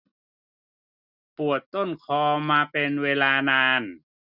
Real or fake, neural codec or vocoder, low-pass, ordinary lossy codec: real; none; 5.4 kHz; none